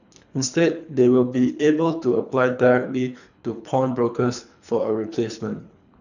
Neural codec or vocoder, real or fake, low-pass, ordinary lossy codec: codec, 24 kHz, 3 kbps, HILCodec; fake; 7.2 kHz; none